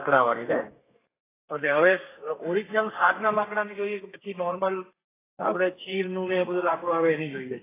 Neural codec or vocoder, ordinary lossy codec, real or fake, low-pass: codec, 32 kHz, 1.9 kbps, SNAC; AAC, 16 kbps; fake; 3.6 kHz